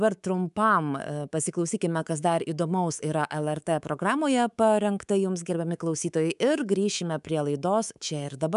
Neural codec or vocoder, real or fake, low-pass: codec, 24 kHz, 3.1 kbps, DualCodec; fake; 10.8 kHz